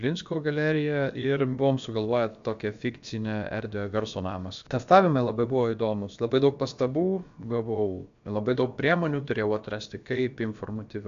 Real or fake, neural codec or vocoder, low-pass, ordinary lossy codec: fake; codec, 16 kHz, about 1 kbps, DyCAST, with the encoder's durations; 7.2 kHz; AAC, 64 kbps